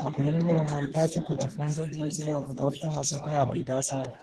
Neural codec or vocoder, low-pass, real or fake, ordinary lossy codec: codec, 24 kHz, 1 kbps, SNAC; 10.8 kHz; fake; Opus, 16 kbps